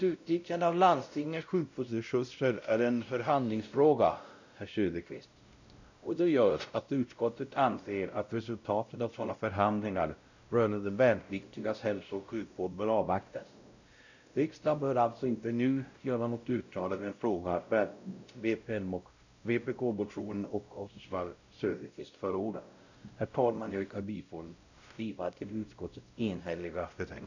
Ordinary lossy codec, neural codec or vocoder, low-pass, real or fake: none; codec, 16 kHz, 0.5 kbps, X-Codec, WavLM features, trained on Multilingual LibriSpeech; 7.2 kHz; fake